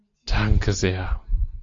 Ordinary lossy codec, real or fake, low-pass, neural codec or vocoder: AAC, 48 kbps; real; 7.2 kHz; none